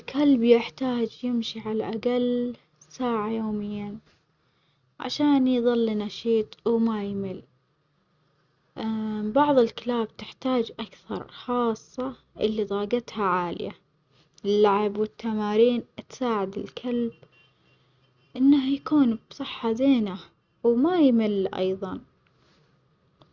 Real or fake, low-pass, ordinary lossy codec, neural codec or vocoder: real; 7.2 kHz; Opus, 64 kbps; none